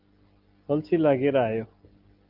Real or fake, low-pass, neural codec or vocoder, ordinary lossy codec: real; 5.4 kHz; none; Opus, 24 kbps